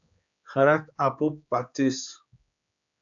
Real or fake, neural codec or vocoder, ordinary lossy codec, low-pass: fake; codec, 16 kHz, 4 kbps, X-Codec, HuBERT features, trained on general audio; AAC, 64 kbps; 7.2 kHz